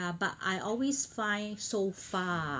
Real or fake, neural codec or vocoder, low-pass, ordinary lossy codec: real; none; none; none